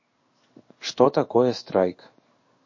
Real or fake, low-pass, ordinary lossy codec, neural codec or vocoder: fake; 7.2 kHz; MP3, 32 kbps; codec, 16 kHz in and 24 kHz out, 1 kbps, XY-Tokenizer